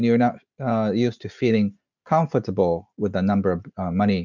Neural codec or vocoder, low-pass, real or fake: none; 7.2 kHz; real